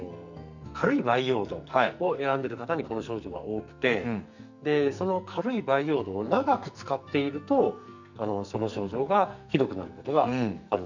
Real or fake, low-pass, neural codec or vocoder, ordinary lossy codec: fake; 7.2 kHz; codec, 44.1 kHz, 2.6 kbps, SNAC; none